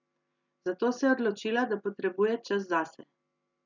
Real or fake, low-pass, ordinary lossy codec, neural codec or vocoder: real; 7.2 kHz; none; none